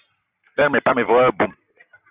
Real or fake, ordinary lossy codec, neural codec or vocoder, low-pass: real; Opus, 64 kbps; none; 3.6 kHz